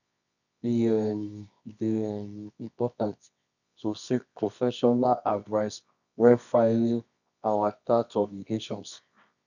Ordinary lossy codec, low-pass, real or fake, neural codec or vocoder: none; 7.2 kHz; fake; codec, 24 kHz, 0.9 kbps, WavTokenizer, medium music audio release